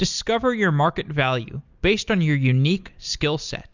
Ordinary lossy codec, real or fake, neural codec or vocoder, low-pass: Opus, 64 kbps; real; none; 7.2 kHz